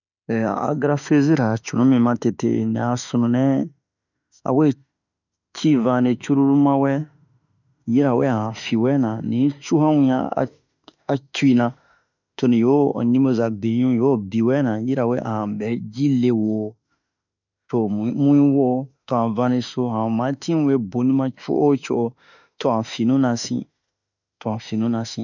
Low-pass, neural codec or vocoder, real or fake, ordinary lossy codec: 7.2 kHz; autoencoder, 48 kHz, 32 numbers a frame, DAC-VAE, trained on Japanese speech; fake; none